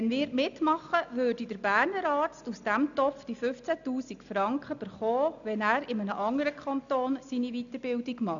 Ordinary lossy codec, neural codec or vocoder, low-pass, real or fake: none; none; 7.2 kHz; real